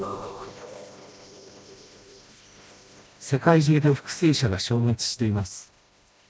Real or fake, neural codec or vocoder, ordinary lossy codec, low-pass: fake; codec, 16 kHz, 1 kbps, FreqCodec, smaller model; none; none